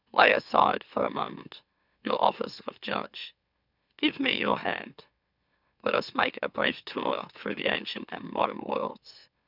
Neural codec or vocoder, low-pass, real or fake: autoencoder, 44.1 kHz, a latent of 192 numbers a frame, MeloTTS; 5.4 kHz; fake